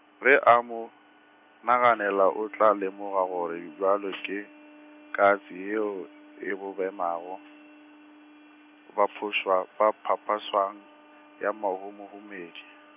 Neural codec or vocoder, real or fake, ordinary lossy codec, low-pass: none; real; none; 3.6 kHz